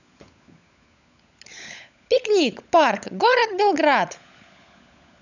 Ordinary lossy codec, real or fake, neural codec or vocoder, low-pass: none; fake; codec, 16 kHz, 16 kbps, FunCodec, trained on LibriTTS, 50 frames a second; 7.2 kHz